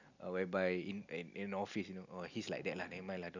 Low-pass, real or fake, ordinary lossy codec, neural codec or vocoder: 7.2 kHz; real; none; none